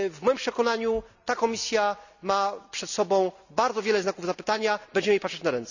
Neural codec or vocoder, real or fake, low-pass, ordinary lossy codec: none; real; 7.2 kHz; none